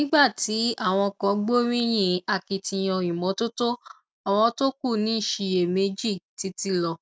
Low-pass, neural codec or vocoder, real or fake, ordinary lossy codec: none; none; real; none